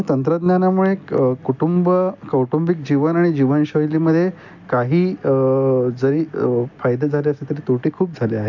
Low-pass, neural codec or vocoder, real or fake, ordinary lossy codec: 7.2 kHz; none; real; none